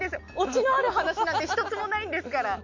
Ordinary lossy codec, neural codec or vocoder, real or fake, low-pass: MP3, 48 kbps; none; real; 7.2 kHz